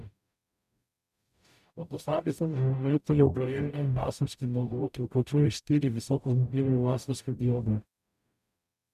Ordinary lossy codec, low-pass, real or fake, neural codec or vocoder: none; 14.4 kHz; fake; codec, 44.1 kHz, 0.9 kbps, DAC